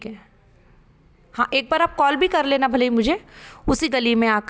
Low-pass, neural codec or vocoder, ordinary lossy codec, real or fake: none; none; none; real